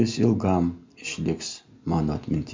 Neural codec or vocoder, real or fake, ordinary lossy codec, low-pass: none; real; MP3, 64 kbps; 7.2 kHz